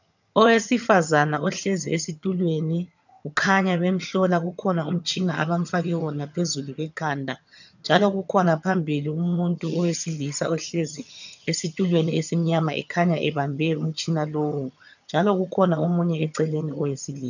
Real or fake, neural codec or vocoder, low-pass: fake; vocoder, 22.05 kHz, 80 mel bands, HiFi-GAN; 7.2 kHz